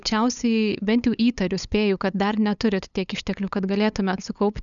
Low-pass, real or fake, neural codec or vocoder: 7.2 kHz; fake; codec, 16 kHz, 8 kbps, FunCodec, trained on LibriTTS, 25 frames a second